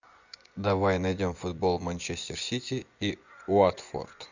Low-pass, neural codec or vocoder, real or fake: 7.2 kHz; vocoder, 24 kHz, 100 mel bands, Vocos; fake